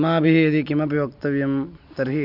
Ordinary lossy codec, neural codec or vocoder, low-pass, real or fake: none; none; 5.4 kHz; real